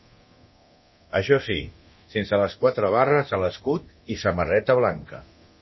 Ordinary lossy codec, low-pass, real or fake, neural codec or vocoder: MP3, 24 kbps; 7.2 kHz; fake; codec, 24 kHz, 0.9 kbps, DualCodec